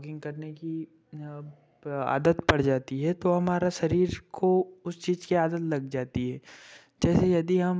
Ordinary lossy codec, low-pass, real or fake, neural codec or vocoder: none; none; real; none